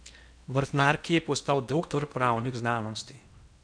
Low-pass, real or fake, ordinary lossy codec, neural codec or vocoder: 9.9 kHz; fake; none; codec, 16 kHz in and 24 kHz out, 0.8 kbps, FocalCodec, streaming, 65536 codes